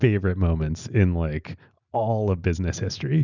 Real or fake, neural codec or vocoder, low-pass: real; none; 7.2 kHz